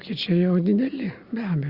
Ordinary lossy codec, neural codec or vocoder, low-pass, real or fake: Opus, 64 kbps; none; 5.4 kHz; real